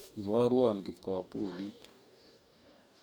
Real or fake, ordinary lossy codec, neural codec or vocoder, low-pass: fake; none; codec, 44.1 kHz, 2.6 kbps, DAC; none